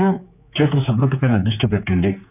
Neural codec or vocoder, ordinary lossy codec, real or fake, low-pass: codec, 16 kHz, 4 kbps, X-Codec, HuBERT features, trained on general audio; none; fake; 3.6 kHz